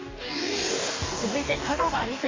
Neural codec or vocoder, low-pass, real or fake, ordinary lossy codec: codec, 44.1 kHz, 2.6 kbps, DAC; 7.2 kHz; fake; AAC, 32 kbps